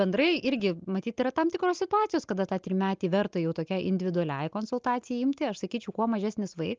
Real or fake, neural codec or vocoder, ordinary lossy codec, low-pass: real; none; Opus, 24 kbps; 7.2 kHz